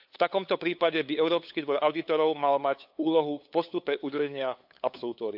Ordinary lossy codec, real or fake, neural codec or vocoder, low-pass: AAC, 48 kbps; fake; codec, 16 kHz, 8 kbps, FunCodec, trained on LibriTTS, 25 frames a second; 5.4 kHz